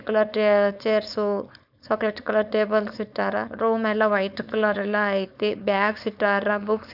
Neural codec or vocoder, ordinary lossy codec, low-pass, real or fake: codec, 16 kHz, 4.8 kbps, FACodec; none; 5.4 kHz; fake